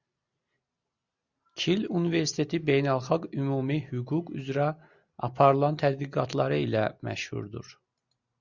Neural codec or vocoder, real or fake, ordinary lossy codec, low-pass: none; real; Opus, 64 kbps; 7.2 kHz